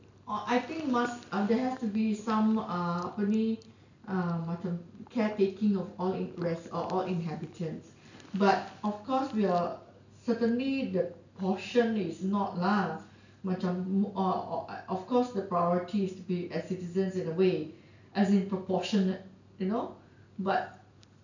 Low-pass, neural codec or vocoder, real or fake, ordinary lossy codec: 7.2 kHz; none; real; none